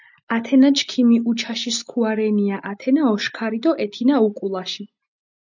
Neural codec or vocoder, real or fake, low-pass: none; real; 7.2 kHz